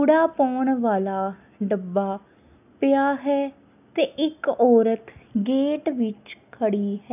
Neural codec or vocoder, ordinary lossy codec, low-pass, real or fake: none; none; 3.6 kHz; real